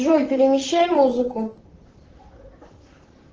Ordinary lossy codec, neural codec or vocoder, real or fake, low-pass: Opus, 16 kbps; vocoder, 44.1 kHz, 128 mel bands, Pupu-Vocoder; fake; 7.2 kHz